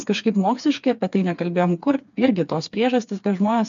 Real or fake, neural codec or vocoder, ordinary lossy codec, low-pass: fake; codec, 16 kHz, 4 kbps, FreqCodec, smaller model; MP3, 64 kbps; 7.2 kHz